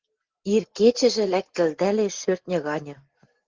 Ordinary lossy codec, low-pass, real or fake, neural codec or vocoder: Opus, 16 kbps; 7.2 kHz; real; none